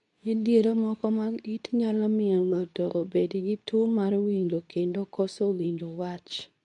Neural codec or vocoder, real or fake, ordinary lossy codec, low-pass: codec, 24 kHz, 0.9 kbps, WavTokenizer, medium speech release version 2; fake; none; 10.8 kHz